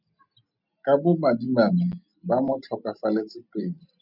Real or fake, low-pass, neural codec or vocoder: real; 5.4 kHz; none